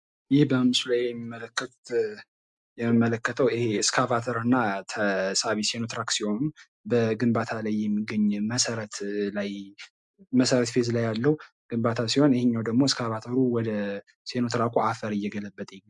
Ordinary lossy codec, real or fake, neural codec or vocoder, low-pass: MP3, 96 kbps; real; none; 10.8 kHz